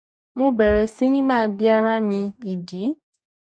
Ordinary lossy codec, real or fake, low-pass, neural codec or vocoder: none; fake; 9.9 kHz; codec, 44.1 kHz, 2.6 kbps, DAC